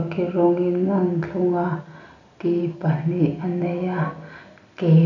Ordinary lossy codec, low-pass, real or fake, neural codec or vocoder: none; 7.2 kHz; real; none